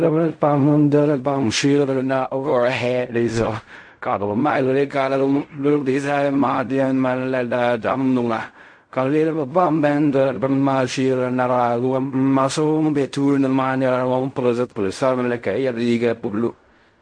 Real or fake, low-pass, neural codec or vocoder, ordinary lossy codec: fake; 9.9 kHz; codec, 16 kHz in and 24 kHz out, 0.4 kbps, LongCat-Audio-Codec, fine tuned four codebook decoder; MP3, 48 kbps